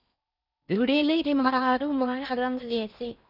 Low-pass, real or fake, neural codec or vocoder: 5.4 kHz; fake; codec, 16 kHz in and 24 kHz out, 0.6 kbps, FocalCodec, streaming, 4096 codes